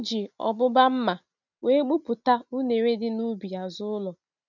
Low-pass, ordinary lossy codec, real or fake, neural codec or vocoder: 7.2 kHz; none; real; none